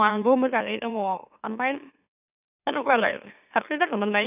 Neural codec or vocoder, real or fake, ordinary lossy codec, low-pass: autoencoder, 44.1 kHz, a latent of 192 numbers a frame, MeloTTS; fake; none; 3.6 kHz